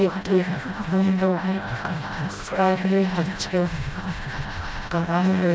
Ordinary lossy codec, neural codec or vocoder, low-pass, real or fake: none; codec, 16 kHz, 0.5 kbps, FreqCodec, smaller model; none; fake